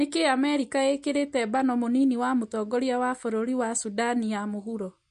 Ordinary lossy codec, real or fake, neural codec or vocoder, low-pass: MP3, 48 kbps; real; none; 14.4 kHz